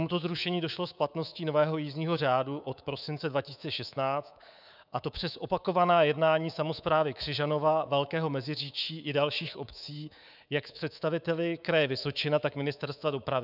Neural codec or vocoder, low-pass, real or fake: codec, 24 kHz, 3.1 kbps, DualCodec; 5.4 kHz; fake